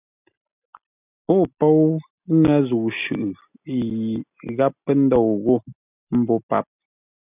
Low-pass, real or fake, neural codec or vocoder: 3.6 kHz; real; none